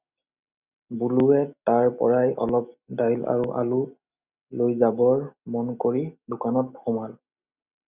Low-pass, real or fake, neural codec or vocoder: 3.6 kHz; real; none